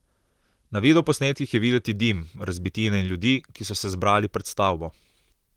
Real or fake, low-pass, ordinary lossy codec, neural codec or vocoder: fake; 19.8 kHz; Opus, 24 kbps; vocoder, 44.1 kHz, 128 mel bands, Pupu-Vocoder